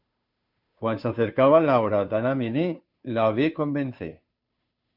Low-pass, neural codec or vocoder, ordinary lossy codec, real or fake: 5.4 kHz; codec, 16 kHz, 2 kbps, FunCodec, trained on Chinese and English, 25 frames a second; MP3, 48 kbps; fake